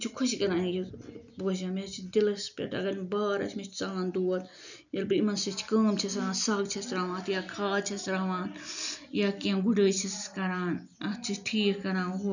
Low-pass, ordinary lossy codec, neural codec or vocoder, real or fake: 7.2 kHz; none; none; real